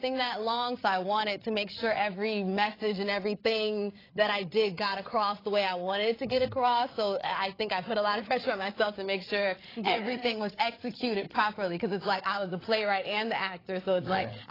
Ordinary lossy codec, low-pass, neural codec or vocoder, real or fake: AAC, 24 kbps; 5.4 kHz; codec, 16 kHz, 8 kbps, FreqCodec, larger model; fake